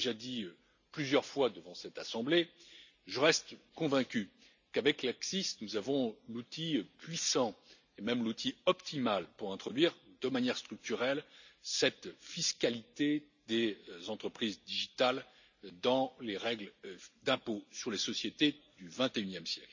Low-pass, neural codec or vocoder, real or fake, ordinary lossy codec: 7.2 kHz; none; real; none